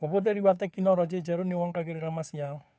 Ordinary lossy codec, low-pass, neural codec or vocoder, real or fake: none; none; codec, 16 kHz, 2 kbps, FunCodec, trained on Chinese and English, 25 frames a second; fake